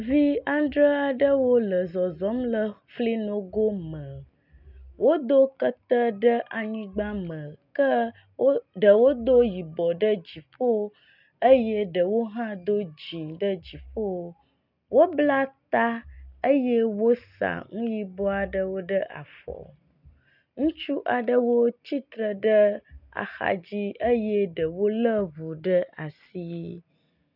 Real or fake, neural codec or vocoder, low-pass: real; none; 5.4 kHz